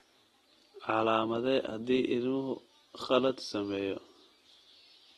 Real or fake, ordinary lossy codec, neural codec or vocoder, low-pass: real; AAC, 32 kbps; none; 19.8 kHz